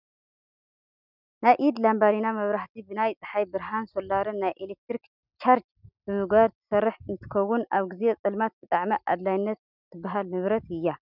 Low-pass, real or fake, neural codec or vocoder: 5.4 kHz; real; none